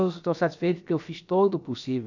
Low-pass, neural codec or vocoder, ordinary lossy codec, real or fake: 7.2 kHz; codec, 16 kHz, about 1 kbps, DyCAST, with the encoder's durations; none; fake